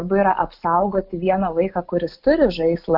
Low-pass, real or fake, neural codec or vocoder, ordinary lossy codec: 5.4 kHz; real; none; Opus, 32 kbps